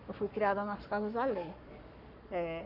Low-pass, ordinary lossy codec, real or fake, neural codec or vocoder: 5.4 kHz; MP3, 48 kbps; fake; codec, 44.1 kHz, 7.8 kbps, Pupu-Codec